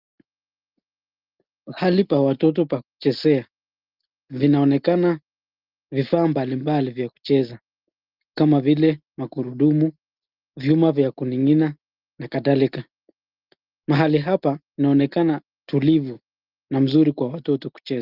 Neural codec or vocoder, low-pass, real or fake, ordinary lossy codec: none; 5.4 kHz; real; Opus, 32 kbps